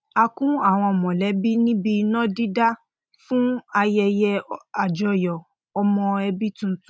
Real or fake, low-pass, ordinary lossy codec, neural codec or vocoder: real; none; none; none